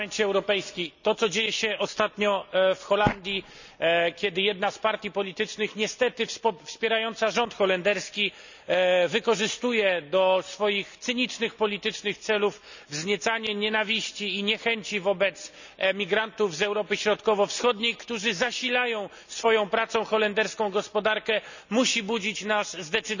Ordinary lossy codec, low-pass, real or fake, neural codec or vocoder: none; 7.2 kHz; real; none